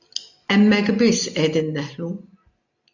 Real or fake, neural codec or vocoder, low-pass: real; none; 7.2 kHz